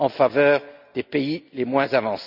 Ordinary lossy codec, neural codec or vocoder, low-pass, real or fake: none; none; 5.4 kHz; real